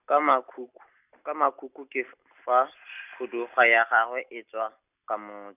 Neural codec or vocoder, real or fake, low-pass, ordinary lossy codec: none; real; 3.6 kHz; none